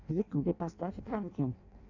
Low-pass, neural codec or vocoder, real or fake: 7.2 kHz; codec, 16 kHz in and 24 kHz out, 0.6 kbps, FireRedTTS-2 codec; fake